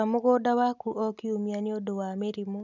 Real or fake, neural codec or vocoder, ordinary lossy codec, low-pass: real; none; none; 7.2 kHz